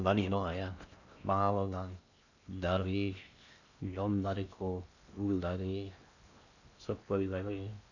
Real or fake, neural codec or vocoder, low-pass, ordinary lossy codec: fake; codec, 16 kHz in and 24 kHz out, 0.6 kbps, FocalCodec, streaming, 4096 codes; 7.2 kHz; none